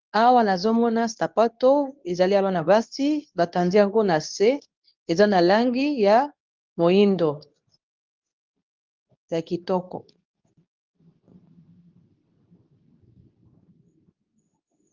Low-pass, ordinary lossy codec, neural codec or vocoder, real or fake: 7.2 kHz; Opus, 24 kbps; codec, 24 kHz, 0.9 kbps, WavTokenizer, medium speech release version 2; fake